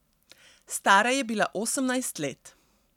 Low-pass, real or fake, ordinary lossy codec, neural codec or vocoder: 19.8 kHz; real; none; none